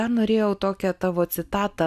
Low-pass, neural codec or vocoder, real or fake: 14.4 kHz; none; real